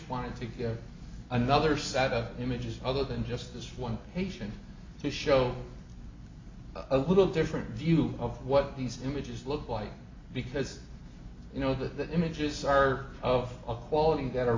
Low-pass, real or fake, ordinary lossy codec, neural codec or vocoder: 7.2 kHz; real; MP3, 64 kbps; none